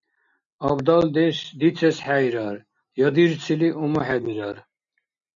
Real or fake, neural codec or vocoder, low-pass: real; none; 7.2 kHz